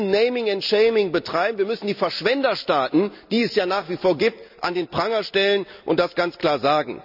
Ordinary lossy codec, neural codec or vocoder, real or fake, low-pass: none; none; real; 5.4 kHz